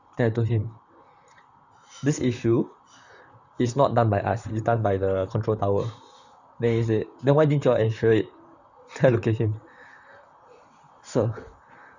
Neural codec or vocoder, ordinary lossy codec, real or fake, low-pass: vocoder, 44.1 kHz, 128 mel bands, Pupu-Vocoder; none; fake; 7.2 kHz